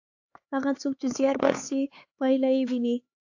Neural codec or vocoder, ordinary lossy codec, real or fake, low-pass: codec, 16 kHz, 4 kbps, X-Codec, HuBERT features, trained on LibriSpeech; AAC, 48 kbps; fake; 7.2 kHz